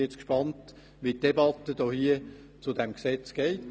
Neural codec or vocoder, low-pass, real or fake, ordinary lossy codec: none; none; real; none